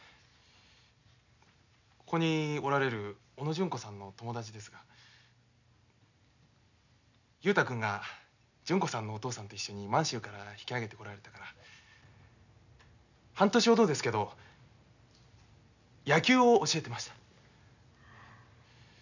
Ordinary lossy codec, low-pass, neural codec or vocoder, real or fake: none; 7.2 kHz; none; real